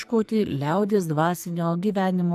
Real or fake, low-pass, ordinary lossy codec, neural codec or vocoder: fake; 14.4 kHz; AAC, 96 kbps; codec, 44.1 kHz, 2.6 kbps, SNAC